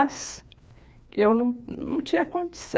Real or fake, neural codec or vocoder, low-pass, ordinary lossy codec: fake; codec, 16 kHz, 2 kbps, FreqCodec, larger model; none; none